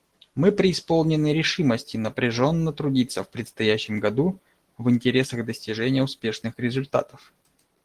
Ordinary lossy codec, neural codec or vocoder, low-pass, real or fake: Opus, 16 kbps; none; 14.4 kHz; real